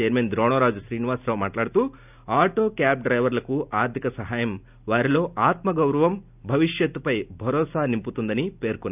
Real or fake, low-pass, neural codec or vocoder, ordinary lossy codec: real; 3.6 kHz; none; none